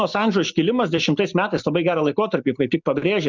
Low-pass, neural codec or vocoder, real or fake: 7.2 kHz; none; real